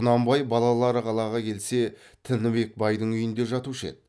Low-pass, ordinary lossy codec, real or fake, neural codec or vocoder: none; none; real; none